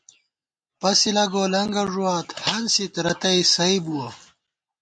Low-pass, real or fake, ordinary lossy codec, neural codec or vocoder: 9.9 kHz; real; MP3, 48 kbps; none